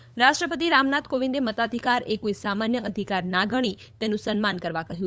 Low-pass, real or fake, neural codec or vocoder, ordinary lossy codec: none; fake; codec, 16 kHz, 8 kbps, FunCodec, trained on LibriTTS, 25 frames a second; none